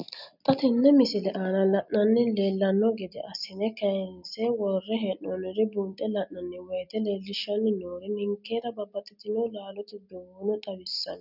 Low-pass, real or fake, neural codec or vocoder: 5.4 kHz; real; none